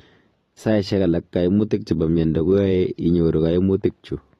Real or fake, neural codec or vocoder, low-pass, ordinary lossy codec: fake; vocoder, 44.1 kHz, 128 mel bands every 512 samples, BigVGAN v2; 19.8 kHz; AAC, 32 kbps